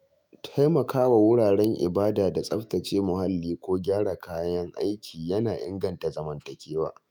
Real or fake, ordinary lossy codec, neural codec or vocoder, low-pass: fake; none; autoencoder, 48 kHz, 128 numbers a frame, DAC-VAE, trained on Japanese speech; none